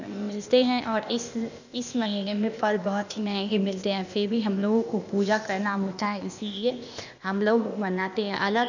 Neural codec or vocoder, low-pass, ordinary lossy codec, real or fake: codec, 16 kHz, 0.8 kbps, ZipCodec; 7.2 kHz; none; fake